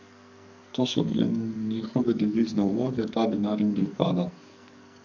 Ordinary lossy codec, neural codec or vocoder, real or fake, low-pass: none; codec, 44.1 kHz, 2.6 kbps, SNAC; fake; 7.2 kHz